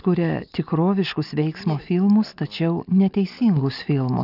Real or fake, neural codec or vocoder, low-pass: real; none; 5.4 kHz